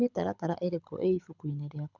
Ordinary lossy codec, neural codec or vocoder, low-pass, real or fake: none; codec, 24 kHz, 6 kbps, HILCodec; 7.2 kHz; fake